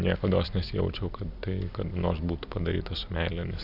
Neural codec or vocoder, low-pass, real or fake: none; 5.4 kHz; real